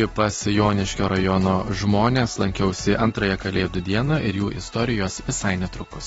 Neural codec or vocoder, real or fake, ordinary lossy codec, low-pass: none; real; AAC, 24 kbps; 19.8 kHz